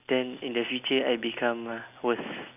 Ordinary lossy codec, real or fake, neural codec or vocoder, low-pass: none; real; none; 3.6 kHz